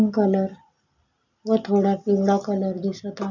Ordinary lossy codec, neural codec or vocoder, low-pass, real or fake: none; none; 7.2 kHz; real